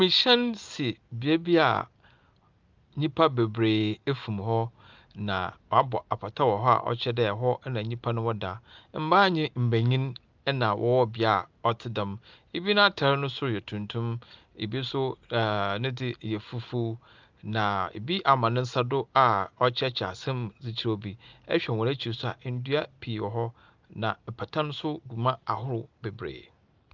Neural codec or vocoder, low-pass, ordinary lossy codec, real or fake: none; 7.2 kHz; Opus, 24 kbps; real